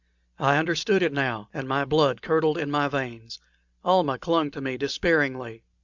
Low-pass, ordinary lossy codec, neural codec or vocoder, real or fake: 7.2 kHz; Opus, 64 kbps; none; real